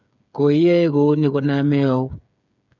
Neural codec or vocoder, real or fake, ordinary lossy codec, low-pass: codec, 16 kHz, 8 kbps, FreqCodec, smaller model; fake; none; 7.2 kHz